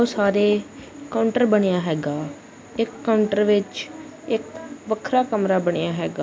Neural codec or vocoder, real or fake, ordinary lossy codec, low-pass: none; real; none; none